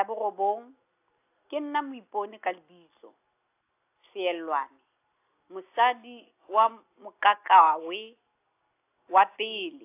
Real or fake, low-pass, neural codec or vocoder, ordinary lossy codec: real; 3.6 kHz; none; AAC, 24 kbps